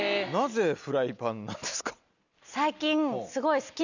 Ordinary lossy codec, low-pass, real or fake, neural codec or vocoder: none; 7.2 kHz; real; none